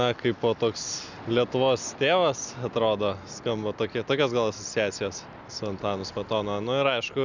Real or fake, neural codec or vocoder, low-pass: real; none; 7.2 kHz